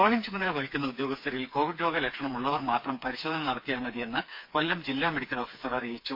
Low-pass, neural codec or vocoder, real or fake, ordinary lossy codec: 5.4 kHz; codec, 16 kHz, 4 kbps, FreqCodec, smaller model; fake; MP3, 32 kbps